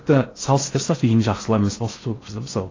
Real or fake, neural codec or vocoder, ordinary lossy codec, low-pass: fake; codec, 16 kHz in and 24 kHz out, 0.6 kbps, FocalCodec, streaming, 4096 codes; AAC, 32 kbps; 7.2 kHz